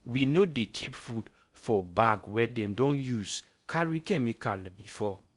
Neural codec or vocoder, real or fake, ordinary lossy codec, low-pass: codec, 16 kHz in and 24 kHz out, 0.6 kbps, FocalCodec, streaming, 4096 codes; fake; Opus, 64 kbps; 10.8 kHz